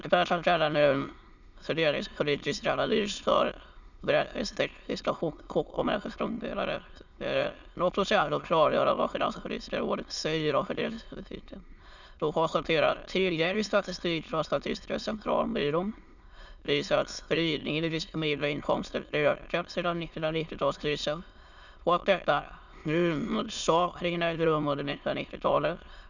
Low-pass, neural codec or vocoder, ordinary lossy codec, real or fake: 7.2 kHz; autoencoder, 22.05 kHz, a latent of 192 numbers a frame, VITS, trained on many speakers; none; fake